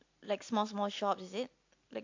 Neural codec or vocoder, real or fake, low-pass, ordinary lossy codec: none; real; 7.2 kHz; AAC, 48 kbps